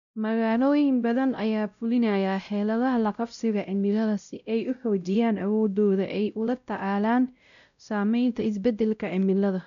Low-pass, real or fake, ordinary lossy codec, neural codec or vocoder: 7.2 kHz; fake; none; codec, 16 kHz, 0.5 kbps, X-Codec, WavLM features, trained on Multilingual LibriSpeech